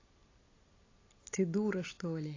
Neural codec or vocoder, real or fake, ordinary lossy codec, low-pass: none; real; AAC, 32 kbps; 7.2 kHz